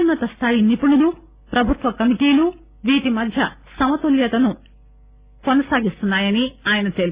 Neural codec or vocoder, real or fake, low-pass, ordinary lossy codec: none; real; 3.6 kHz; Opus, 64 kbps